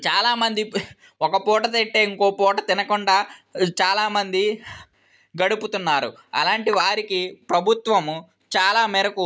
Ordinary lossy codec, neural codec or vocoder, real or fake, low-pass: none; none; real; none